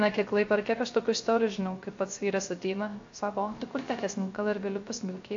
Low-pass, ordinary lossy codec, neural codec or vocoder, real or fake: 7.2 kHz; AAC, 48 kbps; codec, 16 kHz, 0.3 kbps, FocalCodec; fake